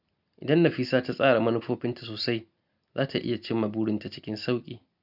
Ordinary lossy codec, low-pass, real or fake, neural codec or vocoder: none; 5.4 kHz; real; none